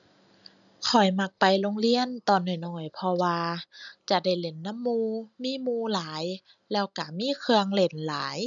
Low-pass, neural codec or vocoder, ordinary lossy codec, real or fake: 7.2 kHz; none; none; real